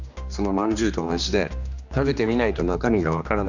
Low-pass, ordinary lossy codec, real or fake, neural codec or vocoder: 7.2 kHz; none; fake; codec, 16 kHz, 2 kbps, X-Codec, HuBERT features, trained on general audio